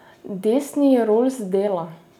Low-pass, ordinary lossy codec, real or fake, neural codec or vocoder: 19.8 kHz; none; real; none